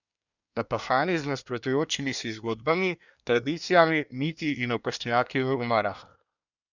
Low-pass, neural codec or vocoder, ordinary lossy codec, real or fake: 7.2 kHz; codec, 24 kHz, 1 kbps, SNAC; none; fake